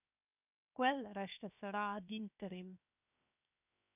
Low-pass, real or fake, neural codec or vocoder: 3.6 kHz; fake; codec, 16 kHz, 0.7 kbps, FocalCodec